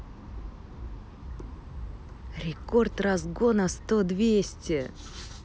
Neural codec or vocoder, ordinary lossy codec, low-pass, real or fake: none; none; none; real